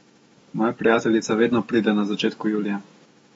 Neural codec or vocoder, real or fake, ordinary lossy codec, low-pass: autoencoder, 48 kHz, 128 numbers a frame, DAC-VAE, trained on Japanese speech; fake; AAC, 24 kbps; 19.8 kHz